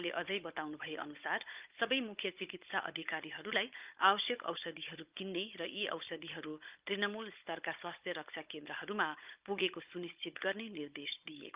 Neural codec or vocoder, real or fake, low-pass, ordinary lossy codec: codec, 16 kHz, 16 kbps, FunCodec, trained on Chinese and English, 50 frames a second; fake; 3.6 kHz; Opus, 24 kbps